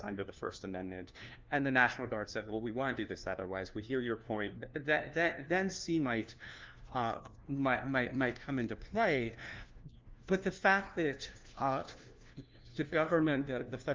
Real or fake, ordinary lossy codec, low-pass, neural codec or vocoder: fake; Opus, 32 kbps; 7.2 kHz; codec, 16 kHz, 1 kbps, FunCodec, trained on LibriTTS, 50 frames a second